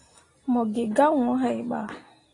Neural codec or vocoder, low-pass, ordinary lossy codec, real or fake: none; 10.8 kHz; AAC, 32 kbps; real